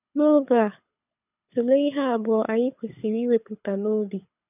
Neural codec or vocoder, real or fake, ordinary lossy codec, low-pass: codec, 24 kHz, 6 kbps, HILCodec; fake; none; 3.6 kHz